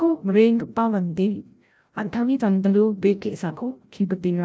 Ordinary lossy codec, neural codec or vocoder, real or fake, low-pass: none; codec, 16 kHz, 0.5 kbps, FreqCodec, larger model; fake; none